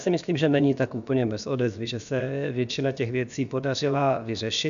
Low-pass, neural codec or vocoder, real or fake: 7.2 kHz; codec, 16 kHz, about 1 kbps, DyCAST, with the encoder's durations; fake